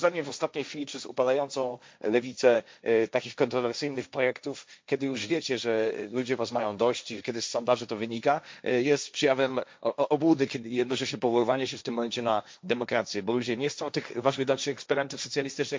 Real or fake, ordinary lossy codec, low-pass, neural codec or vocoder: fake; none; none; codec, 16 kHz, 1.1 kbps, Voila-Tokenizer